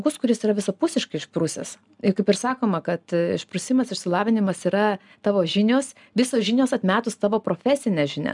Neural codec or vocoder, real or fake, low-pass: none; real; 10.8 kHz